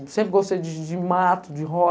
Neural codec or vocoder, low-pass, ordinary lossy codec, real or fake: none; none; none; real